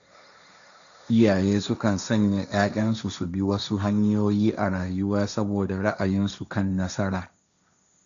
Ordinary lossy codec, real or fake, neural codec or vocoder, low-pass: none; fake; codec, 16 kHz, 1.1 kbps, Voila-Tokenizer; 7.2 kHz